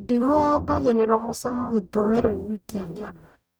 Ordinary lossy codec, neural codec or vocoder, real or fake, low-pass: none; codec, 44.1 kHz, 0.9 kbps, DAC; fake; none